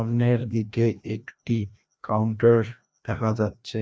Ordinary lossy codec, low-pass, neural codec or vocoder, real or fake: none; none; codec, 16 kHz, 1 kbps, FreqCodec, larger model; fake